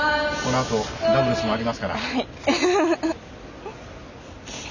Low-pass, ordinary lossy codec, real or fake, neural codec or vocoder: 7.2 kHz; none; real; none